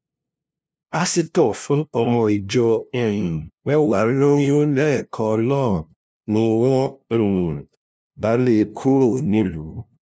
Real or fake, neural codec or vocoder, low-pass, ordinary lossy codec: fake; codec, 16 kHz, 0.5 kbps, FunCodec, trained on LibriTTS, 25 frames a second; none; none